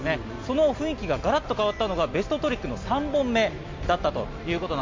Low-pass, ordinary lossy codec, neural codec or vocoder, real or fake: 7.2 kHz; MP3, 48 kbps; none; real